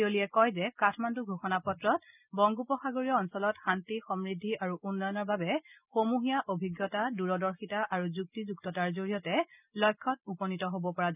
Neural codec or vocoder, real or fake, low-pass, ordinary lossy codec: none; real; 3.6 kHz; none